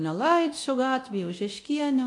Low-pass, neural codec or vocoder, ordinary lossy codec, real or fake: 10.8 kHz; codec, 24 kHz, 0.9 kbps, DualCodec; AAC, 48 kbps; fake